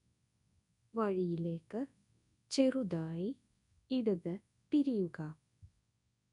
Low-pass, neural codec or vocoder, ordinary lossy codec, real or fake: 10.8 kHz; codec, 24 kHz, 0.9 kbps, WavTokenizer, large speech release; none; fake